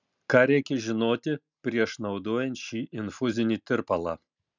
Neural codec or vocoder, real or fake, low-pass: none; real; 7.2 kHz